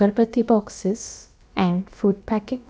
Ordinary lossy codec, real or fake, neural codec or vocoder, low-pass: none; fake; codec, 16 kHz, about 1 kbps, DyCAST, with the encoder's durations; none